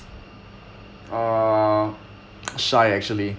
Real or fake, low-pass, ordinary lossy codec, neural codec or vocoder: real; none; none; none